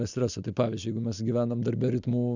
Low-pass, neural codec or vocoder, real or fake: 7.2 kHz; none; real